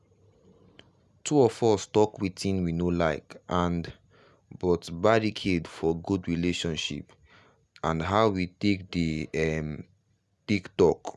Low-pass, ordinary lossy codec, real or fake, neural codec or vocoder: none; none; real; none